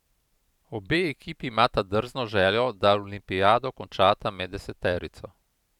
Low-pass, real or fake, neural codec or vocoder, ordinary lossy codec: 19.8 kHz; fake; vocoder, 44.1 kHz, 128 mel bands every 512 samples, BigVGAN v2; none